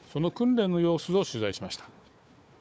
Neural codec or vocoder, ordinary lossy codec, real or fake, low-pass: codec, 16 kHz, 4 kbps, FunCodec, trained on Chinese and English, 50 frames a second; none; fake; none